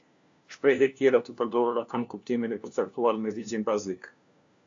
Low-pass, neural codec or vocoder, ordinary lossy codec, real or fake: 7.2 kHz; codec, 16 kHz, 1 kbps, FunCodec, trained on LibriTTS, 50 frames a second; AAC, 64 kbps; fake